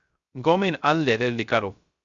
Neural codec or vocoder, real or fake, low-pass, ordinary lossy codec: codec, 16 kHz, 0.3 kbps, FocalCodec; fake; 7.2 kHz; Opus, 64 kbps